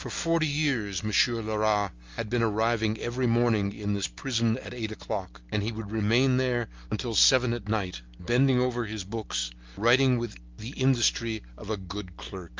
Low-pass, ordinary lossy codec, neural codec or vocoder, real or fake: 7.2 kHz; Opus, 32 kbps; none; real